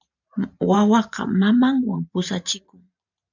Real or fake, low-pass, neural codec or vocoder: fake; 7.2 kHz; vocoder, 44.1 kHz, 128 mel bands every 512 samples, BigVGAN v2